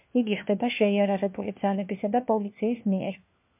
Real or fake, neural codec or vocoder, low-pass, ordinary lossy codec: fake; codec, 16 kHz, 1 kbps, FunCodec, trained on LibriTTS, 50 frames a second; 3.6 kHz; MP3, 32 kbps